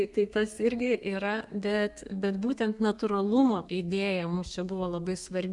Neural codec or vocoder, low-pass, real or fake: codec, 32 kHz, 1.9 kbps, SNAC; 10.8 kHz; fake